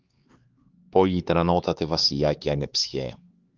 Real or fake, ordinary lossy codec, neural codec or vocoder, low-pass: fake; Opus, 24 kbps; codec, 16 kHz, 4 kbps, X-Codec, HuBERT features, trained on LibriSpeech; 7.2 kHz